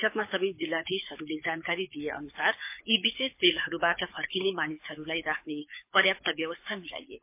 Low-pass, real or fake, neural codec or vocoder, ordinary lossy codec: 3.6 kHz; fake; codec, 24 kHz, 6 kbps, HILCodec; MP3, 24 kbps